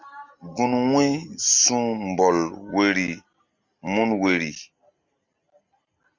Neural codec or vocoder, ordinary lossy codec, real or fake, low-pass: none; Opus, 64 kbps; real; 7.2 kHz